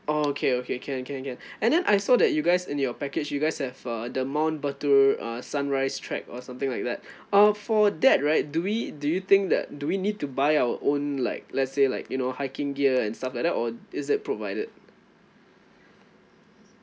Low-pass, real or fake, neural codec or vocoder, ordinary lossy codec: none; real; none; none